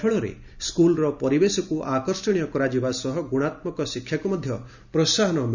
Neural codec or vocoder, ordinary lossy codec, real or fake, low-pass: none; none; real; 7.2 kHz